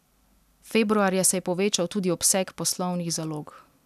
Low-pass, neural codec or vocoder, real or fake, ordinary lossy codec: 14.4 kHz; none; real; none